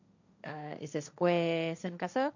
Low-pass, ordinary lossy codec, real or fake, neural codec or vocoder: 7.2 kHz; none; fake; codec, 16 kHz, 1.1 kbps, Voila-Tokenizer